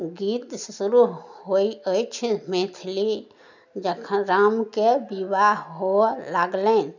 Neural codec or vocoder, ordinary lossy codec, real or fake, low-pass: none; none; real; 7.2 kHz